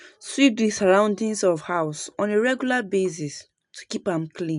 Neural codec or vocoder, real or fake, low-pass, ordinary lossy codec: none; real; 10.8 kHz; none